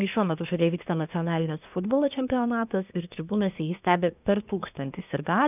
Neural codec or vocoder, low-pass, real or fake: codec, 24 kHz, 1 kbps, SNAC; 3.6 kHz; fake